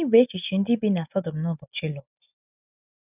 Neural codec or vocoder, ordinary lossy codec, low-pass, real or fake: none; none; 3.6 kHz; real